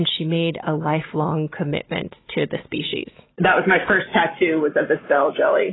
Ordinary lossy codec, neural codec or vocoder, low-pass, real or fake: AAC, 16 kbps; none; 7.2 kHz; real